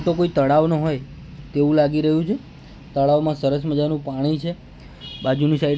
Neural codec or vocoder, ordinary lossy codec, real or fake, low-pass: none; none; real; none